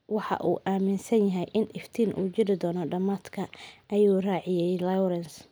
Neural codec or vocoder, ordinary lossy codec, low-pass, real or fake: none; none; none; real